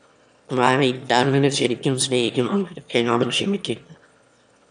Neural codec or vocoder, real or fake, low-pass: autoencoder, 22.05 kHz, a latent of 192 numbers a frame, VITS, trained on one speaker; fake; 9.9 kHz